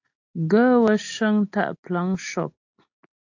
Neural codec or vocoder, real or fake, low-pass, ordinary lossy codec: none; real; 7.2 kHz; AAC, 48 kbps